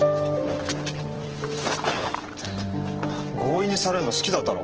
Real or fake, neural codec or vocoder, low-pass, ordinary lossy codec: fake; vocoder, 44.1 kHz, 128 mel bands every 512 samples, BigVGAN v2; 7.2 kHz; Opus, 16 kbps